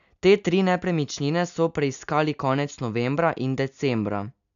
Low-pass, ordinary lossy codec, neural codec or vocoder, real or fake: 7.2 kHz; none; none; real